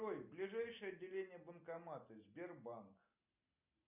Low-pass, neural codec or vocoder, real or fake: 3.6 kHz; none; real